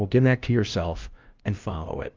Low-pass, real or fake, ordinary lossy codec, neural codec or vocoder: 7.2 kHz; fake; Opus, 24 kbps; codec, 16 kHz, 0.5 kbps, FunCodec, trained on LibriTTS, 25 frames a second